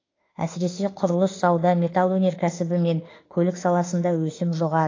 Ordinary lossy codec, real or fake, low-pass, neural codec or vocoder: AAC, 32 kbps; fake; 7.2 kHz; autoencoder, 48 kHz, 32 numbers a frame, DAC-VAE, trained on Japanese speech